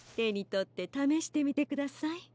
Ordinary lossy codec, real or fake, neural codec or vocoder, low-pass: none; real; none; none